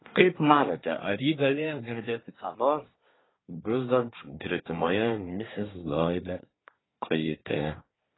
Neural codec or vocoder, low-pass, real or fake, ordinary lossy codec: codec, 24 kHz, 1 kbps, SNAC; 7.2 kHz; fake; AAC, 16 kbps